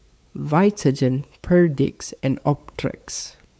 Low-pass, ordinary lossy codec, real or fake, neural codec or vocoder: none; none; fake; codec, 16 kHz, 4 kbps, X-Codec, WavLM features, trained on Multilingual LibriSpeech